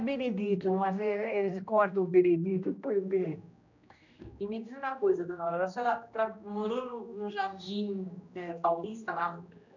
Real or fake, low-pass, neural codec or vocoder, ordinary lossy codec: fake; 7.2 kHz; codec, 16 kHz, 1 kbps, X-Codec, HuBERT features, trained on general audio; none